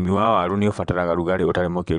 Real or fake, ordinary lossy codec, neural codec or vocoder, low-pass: fake; none; vocoder, 22.05 kHz, 80 mel bands, WaveNeXt; 9.9 kHz